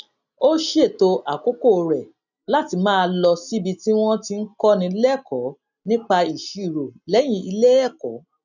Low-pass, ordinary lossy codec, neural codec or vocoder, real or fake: 7.2 kHz; none; none; real